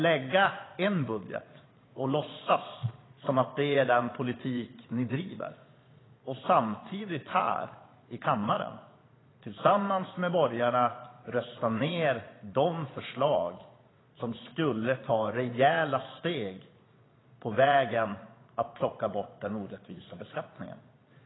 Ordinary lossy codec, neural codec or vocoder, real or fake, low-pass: AAC, 16 kbps; vocoder, 22.05 kHz, 80 mel bands, Vocos; fake; 7.2 kHz